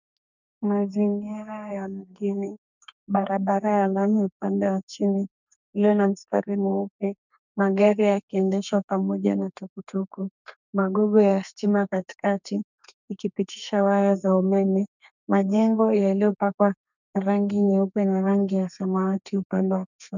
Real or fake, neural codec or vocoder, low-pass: fake; codec, 32 kHz, 1.9 kbps, SNAC; 7.2 kHz